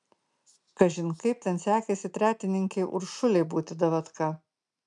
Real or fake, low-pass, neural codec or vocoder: real; 10.8 kHz; none